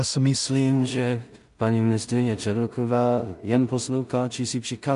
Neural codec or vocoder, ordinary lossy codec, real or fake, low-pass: codec, 16 kHz in and 24 kHz out, 0.4 kbps, LongCat-Audio-Codec, two codebook decoder; MP3, 64 kbps; fake; 10.8 kHz